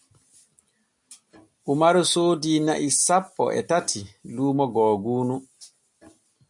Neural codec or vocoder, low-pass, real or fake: none; 10.8 kHz; real